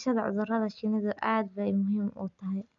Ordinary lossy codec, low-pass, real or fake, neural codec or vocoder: none; 7.2 kHz; real; none